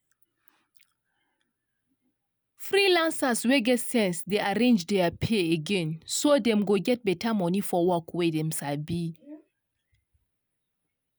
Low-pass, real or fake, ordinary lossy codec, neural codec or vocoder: none; real; none; none